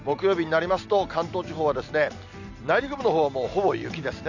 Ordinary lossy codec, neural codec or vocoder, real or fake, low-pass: none; none; real; 7.2 kHz